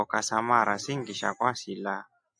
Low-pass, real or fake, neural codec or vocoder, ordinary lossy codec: 9.9 kHz; real; none; AAC, 64 kbps